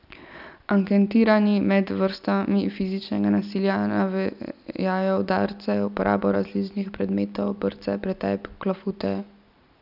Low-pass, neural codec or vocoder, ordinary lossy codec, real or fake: 5.4 kHz; none; none; real